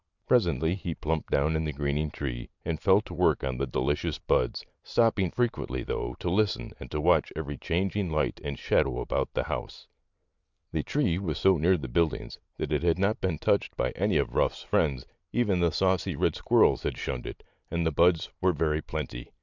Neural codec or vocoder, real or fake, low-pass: none; real; 7.2 kHz